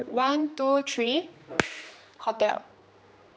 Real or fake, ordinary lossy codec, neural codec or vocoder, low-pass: fake; none; codec, 16 kHz, 2 kbps, X-Codec, HuBERT features, trained on general audio; none